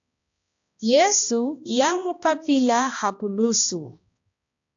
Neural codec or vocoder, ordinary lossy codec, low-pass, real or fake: codec, 16 kHz, 1 kbps, X-Codec, HuBERT features, trained on balanced general audio; AAC, 64 kbps; 7.2 kHz; fake